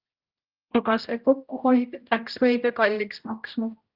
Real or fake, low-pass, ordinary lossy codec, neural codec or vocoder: fake; 5.4 kHz; Opus, 24 kbps; codec, 16 kHz, 0.5 kbps, X-Codec, HuBERT features, trained on general audio